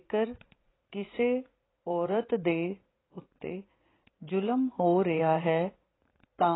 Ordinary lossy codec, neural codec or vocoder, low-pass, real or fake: AAC, 16 kbps; vocoder, 44.1 kHz, 80 mel bands, Vocos; 7.2 kHz; fake